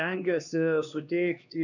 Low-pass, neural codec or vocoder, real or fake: 7.2 kHz; codec, 16 kHz, 2 kbps, X-Codec, HuBERT features, trained on LibriSpeech; fake